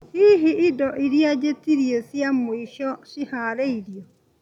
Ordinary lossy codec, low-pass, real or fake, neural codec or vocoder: none; 19.8 kHz; real; none